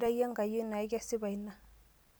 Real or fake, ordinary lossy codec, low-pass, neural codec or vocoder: real; none; none; none